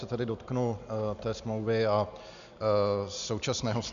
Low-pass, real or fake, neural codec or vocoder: 7.2 kHz; real; none